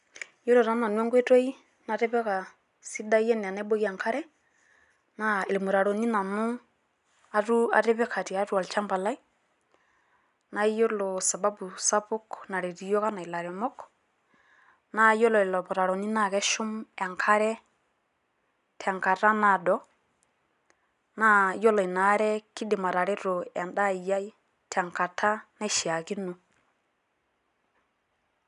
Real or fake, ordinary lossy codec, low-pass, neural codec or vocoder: real; none; 10.8 kHz; none